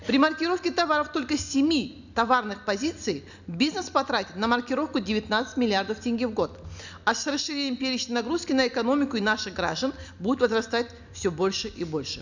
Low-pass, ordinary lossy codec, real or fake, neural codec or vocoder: 7.2 kHz; none; real; none